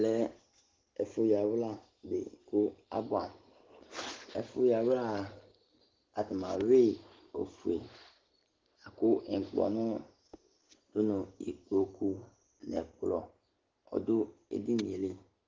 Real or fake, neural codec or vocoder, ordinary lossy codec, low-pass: real; none; Opus, 16 kbps; 7.2 kHz